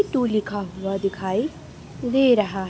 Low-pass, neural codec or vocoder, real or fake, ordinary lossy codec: none; none; real; none